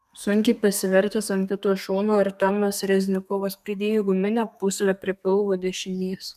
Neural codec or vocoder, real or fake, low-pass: codec, 32 kHz, 1.9 kbps, SNAC; fake; 14.4 kHz